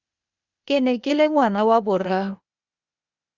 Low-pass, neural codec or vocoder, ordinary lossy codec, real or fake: 7.2 kHz; codec, 16 kHz, 0.8 kbps, ZipCodec; Opus, 64 kbps; fake